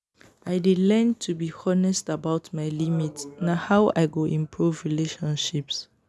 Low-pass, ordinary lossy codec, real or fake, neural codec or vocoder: none; none; real; none